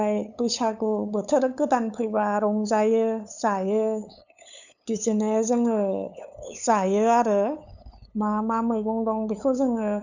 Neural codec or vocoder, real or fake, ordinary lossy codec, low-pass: codec, 16 kHz, 8 kbps, FunCodec, trained on LibriTTS, 25 frames a second; fake; none; 7.2 kHz